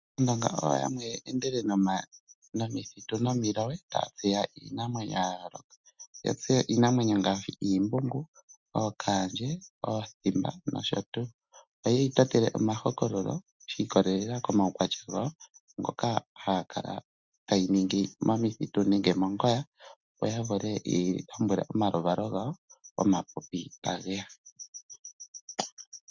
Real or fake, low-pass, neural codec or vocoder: real; 7.2 kHz; none